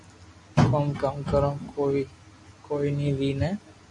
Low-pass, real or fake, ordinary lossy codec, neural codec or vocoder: 10.8 kHz; real; MP3, 96 kbps; none